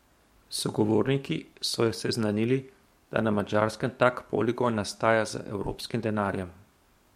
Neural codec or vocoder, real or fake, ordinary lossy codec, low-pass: codec, 44.1 kHz, 7.8 kbps, DAC; fake; MP3, 64 kbps; 19.8 kHz